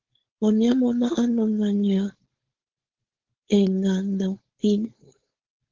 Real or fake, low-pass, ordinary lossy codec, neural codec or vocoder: fake; 7.2 kHz; Opus, 16 kbps; codec, 16 kHz, 4.8 kbps, FACodec